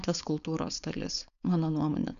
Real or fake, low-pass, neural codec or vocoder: fake; 7.2 kHz; codec, 16 kHz, 4.8 kbps, FACodec